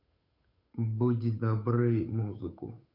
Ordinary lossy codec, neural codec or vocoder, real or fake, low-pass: none; codec, 16 kHz, 8 kbps, FunCodec, trained on Chinese and English, 25 frames a second; fake; 5.4 kHz